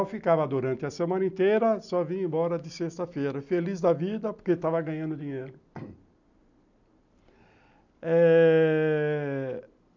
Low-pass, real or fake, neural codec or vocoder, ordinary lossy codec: 7.2 kHz; real; none; none